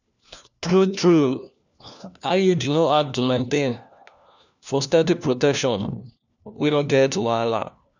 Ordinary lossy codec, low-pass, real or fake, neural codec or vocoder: none; 7.2 kHz; fake; codec, 16 kHz, 1 kbps, FunCodec, trained on LibriTTS, 50 frames a second